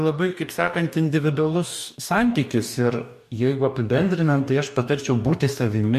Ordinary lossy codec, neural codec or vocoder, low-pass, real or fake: MP3, 64 kbps; codec, 44.1 kHz, 2.6 kbps, DAC; 14.4 kHz; fake